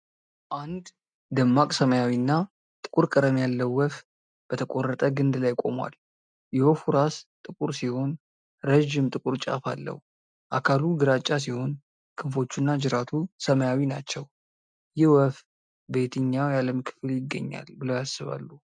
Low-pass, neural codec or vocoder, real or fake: 9.9 kHz; none; real